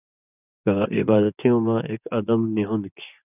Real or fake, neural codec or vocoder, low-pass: fake; vocoder, 24 kHz, 100 mel bands, Vocos; 3.6 kHz